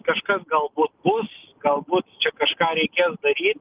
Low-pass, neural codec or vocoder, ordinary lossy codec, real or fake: 3.6 kHz; none; Opus, 32 kbps; real